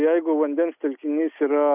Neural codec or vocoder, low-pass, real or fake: none; 3.6 kHz; real